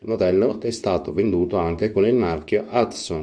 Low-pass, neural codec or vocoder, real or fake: 9.9 kHz; codec, 24 kHz, 0.9 kbps, WavTokenizer, medium speech release version 2; fake